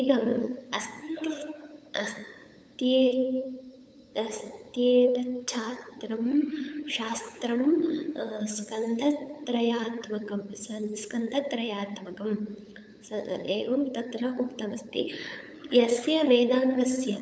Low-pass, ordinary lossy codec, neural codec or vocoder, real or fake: none; none; codec, 16 kHz, 8 kbps, FunCodec, trained on LibriTTS, 25 frames a second; fake